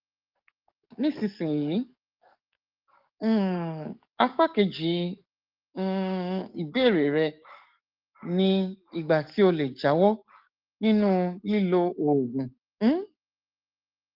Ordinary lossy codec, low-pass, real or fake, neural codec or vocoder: Opus, 32 kbps; 5.4 kHz; fake; codec, 44.1 kHz, 7.8 kbps, DAC